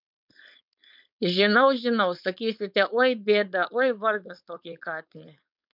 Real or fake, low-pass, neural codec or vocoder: fake; 5.4 kHz; codec, 16 kHz, 4.8 kbps, FACodec